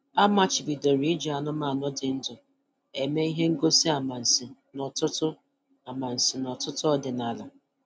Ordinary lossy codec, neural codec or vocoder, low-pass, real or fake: none; none; none; real